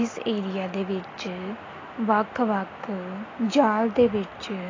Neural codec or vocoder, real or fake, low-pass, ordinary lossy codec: none; real; 7.2 kHz; AAC, 32 kbps